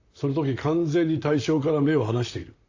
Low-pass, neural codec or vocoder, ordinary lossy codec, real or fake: 7.2 kHz; vocoder, 44.1 kHz, 128 mel bands, Pupu-Vocoder; AAC, 48 kbps; fake